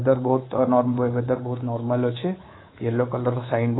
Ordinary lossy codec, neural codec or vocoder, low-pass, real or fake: AAC, 16 kbps; codec, 24 kHz, 3.1 kbps, DualCodec; 7.2 kHz; fake